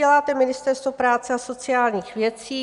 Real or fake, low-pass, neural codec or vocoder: real; 10.8 kHz; none